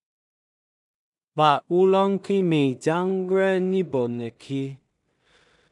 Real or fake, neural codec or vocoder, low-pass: fake; codec, 16 kHz in and 24 kHz out, 0.4 kbps, LongCat-Audio-Codec, two codebook decoder; 10.8 kHz